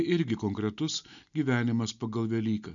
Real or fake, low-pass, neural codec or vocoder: real; 7.2 kHz; none